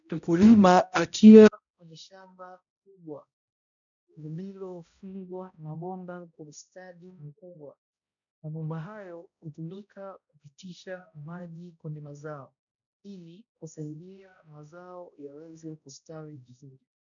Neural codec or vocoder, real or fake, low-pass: codec, 16 kHz, 0.5 kbps, X-Codec, HuBERT features, trained on balanced general audio; fake; 7.2 kHz